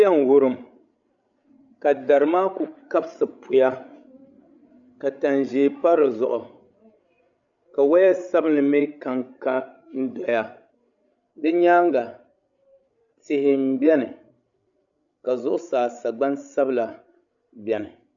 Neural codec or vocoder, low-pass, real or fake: codec, 16 kHz, 16 kbps, FreqCodec, larger model; 7.2 kHz; fake